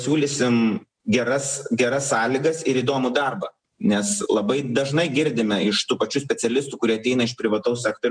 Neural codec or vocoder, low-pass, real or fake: none; 9.9 kHz; real